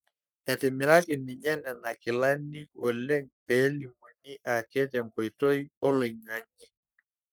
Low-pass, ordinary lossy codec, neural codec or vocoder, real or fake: none; none; codec, 44.1 kHz, 3.4 kbps, Pupu-Codec; fake